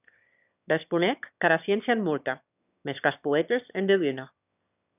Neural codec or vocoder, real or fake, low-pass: autoencoder, 22.05 kHz, a latent of 192 numbers a frame, VITS, trained on one speaker; fake; 3.6 kHz